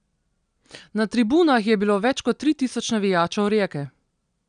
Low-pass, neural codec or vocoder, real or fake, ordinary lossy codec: 9.9 kHz; none; real; none